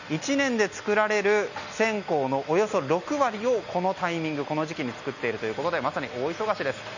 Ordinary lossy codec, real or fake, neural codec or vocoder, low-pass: none; real; none; 7.2 kHz